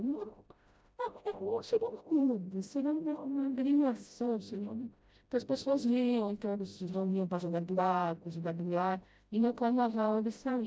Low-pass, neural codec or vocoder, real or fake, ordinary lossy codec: none; codec, 16 kHz, 0.5 kbps, FreqCodec, smaller model; fake; none